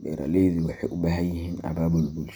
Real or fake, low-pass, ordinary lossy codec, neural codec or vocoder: real; none; none; none